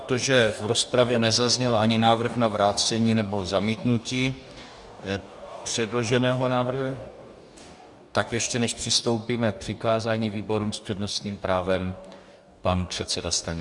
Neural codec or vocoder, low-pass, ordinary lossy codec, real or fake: codec, 44.1 kHz, 2.6 kbps, DAC; 10.8 kHz; Opus, 64 kbps; fake